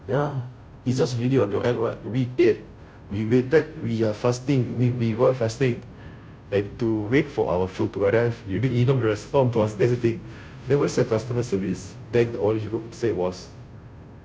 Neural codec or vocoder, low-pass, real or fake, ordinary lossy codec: codec, 16 kHz, 0.5 kbps, FunCodec, trained on Chinese and English, 25 frames a second; none; fake; none